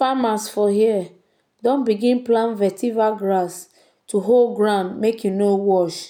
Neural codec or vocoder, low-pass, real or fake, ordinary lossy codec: none; 19.8 kHz; real; none